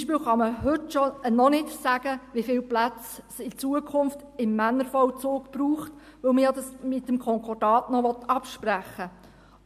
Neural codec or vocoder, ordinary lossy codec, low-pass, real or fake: none; MP3, 64 kbps; 14.4 kHz; real